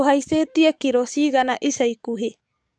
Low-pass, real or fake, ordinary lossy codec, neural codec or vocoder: 9.9 kHz; fake; none; codec, 44.1 kHz, 7.8 kbps, DAC